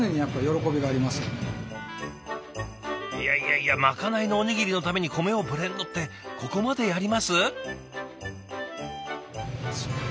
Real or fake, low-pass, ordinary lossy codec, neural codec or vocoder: real; none; none; none